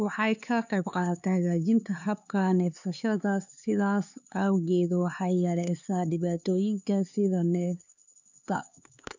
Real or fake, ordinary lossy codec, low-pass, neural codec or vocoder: fake; none; 7.2 kHz; codec, 16 kHz, 4 kbps, X-Codec, HuBERT features, trained on LibriSpeech